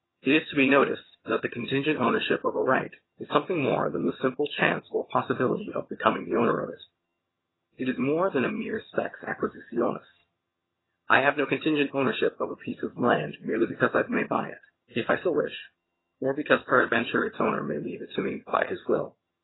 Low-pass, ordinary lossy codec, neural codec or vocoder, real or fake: 7.2 kHz; AAC, 16 kbps; vocoder, 22.05 kHz, 80 mel bands, HiFi-GAN; fake